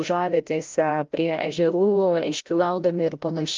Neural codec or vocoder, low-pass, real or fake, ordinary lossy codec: codec, 16 kHz, 0.5 kbps, FreqCodec, larger model; 7.2 kHz; fake; Opus, 32 kbps